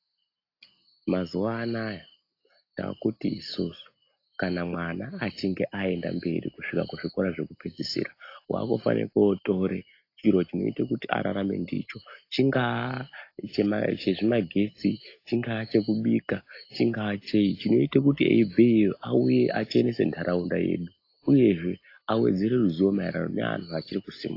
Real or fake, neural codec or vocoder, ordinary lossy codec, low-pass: real; none; AAC, 32 kbps; 5.4 kHz